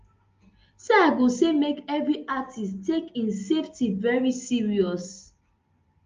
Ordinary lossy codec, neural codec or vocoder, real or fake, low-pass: Opus, 32 kbps; none; real; 7.2 kHz